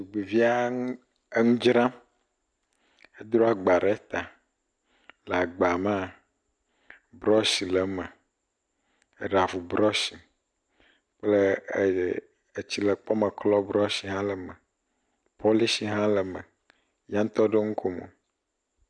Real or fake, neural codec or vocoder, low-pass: real; none; 9.9 kHz